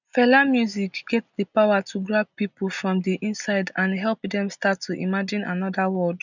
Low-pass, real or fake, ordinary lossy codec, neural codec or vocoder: 7.2 kHz; real; none; none